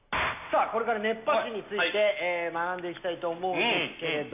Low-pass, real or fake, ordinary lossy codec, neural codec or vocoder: 3.6 kHz; real; none; none